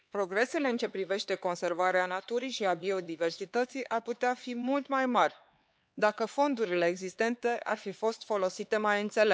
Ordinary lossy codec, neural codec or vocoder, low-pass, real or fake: none; codec, 16 kHz, 4 kbps, X-Codec, HuBERT features, trained on LibriSpeech; none; fake